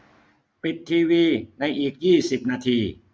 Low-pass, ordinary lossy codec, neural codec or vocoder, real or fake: none; none; none; real